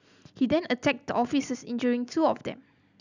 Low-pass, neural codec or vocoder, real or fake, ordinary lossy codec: 7.2 kHz; none; real; none